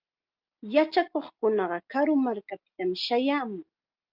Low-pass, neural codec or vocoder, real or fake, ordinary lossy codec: 5.4 kHz; none; real; Opus, 24 kbps